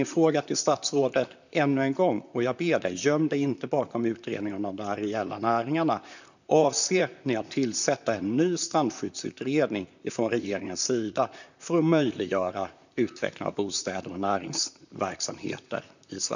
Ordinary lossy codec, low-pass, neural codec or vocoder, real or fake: none; 7.2 kHz; vocoder, 44.1 kHz, 128 mel bands, Pupu-Vocoder; fake